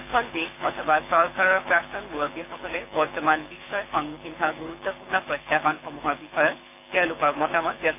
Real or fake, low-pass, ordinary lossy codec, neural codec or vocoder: fake; 3.6 kHz; none; codec, 24 kHz, 6 kbps, HILCodec